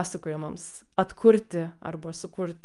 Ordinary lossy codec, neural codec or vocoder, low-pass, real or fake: Opus, 32 kbps; codec, 24 kHz, 0.9 kbps, WavTokenizer, medium speech release version 2; 10.8 kHz; fake